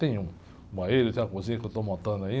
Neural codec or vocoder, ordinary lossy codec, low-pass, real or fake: none; none; none; real